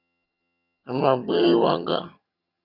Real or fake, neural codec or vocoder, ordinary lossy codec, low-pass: fake; vocoder, 22.05 kHz, 80 mel bands, HiFi-GAN; Opus, 64 kbps; 5.4 kHz